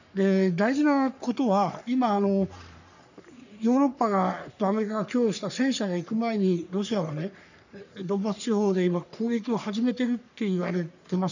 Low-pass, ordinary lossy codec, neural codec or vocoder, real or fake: 7.2 kHz; none; codec, 44.1 kHz, 3.4 kbps, Pupu-Codec; fake